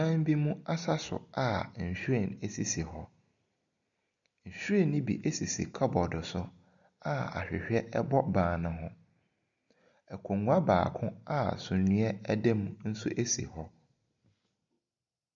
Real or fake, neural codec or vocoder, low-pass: real; none; 7.2 kHz